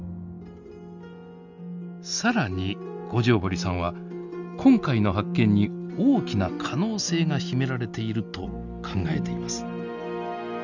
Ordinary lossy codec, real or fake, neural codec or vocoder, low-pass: none; real; none; 7.2 kHz